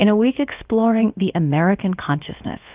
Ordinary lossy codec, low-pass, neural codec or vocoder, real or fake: Opus, 32 kbps; 3.6 kHz; codec, 16 kHz, about 1 kbps, DyCAST, with the encoder's durations; fake